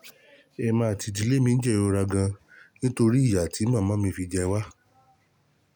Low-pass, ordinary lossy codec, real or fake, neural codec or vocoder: none; none; real; none